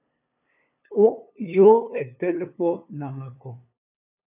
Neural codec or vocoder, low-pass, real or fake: codec, 16 kHz, 2 kbps, FunCodec, trained on LibriTTS, 25 frames a second; 3.6 kHz; fake